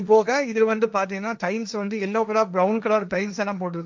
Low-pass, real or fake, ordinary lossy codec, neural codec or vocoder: none; fake; none; codec, 16 kHz, 1.1 kbps, Voila-Tokenizer